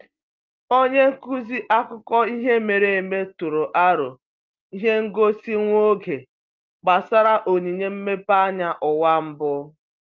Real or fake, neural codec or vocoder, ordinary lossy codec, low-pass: real; none; Opus, 24 kbps; 7.2 kHz